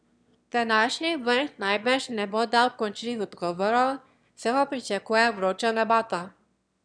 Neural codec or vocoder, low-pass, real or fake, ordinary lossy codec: autoencoder, 22.05 kHz, a latent of 192 numbers a frame, VITS, trained on one speaker; 9.9 kHz; fake; none